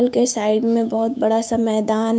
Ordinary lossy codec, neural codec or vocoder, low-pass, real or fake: none; codec, 16 kHz, 6 kbps, DAC; none; fake